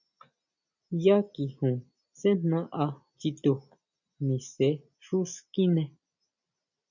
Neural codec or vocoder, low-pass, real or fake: none; 7.2 kHz; real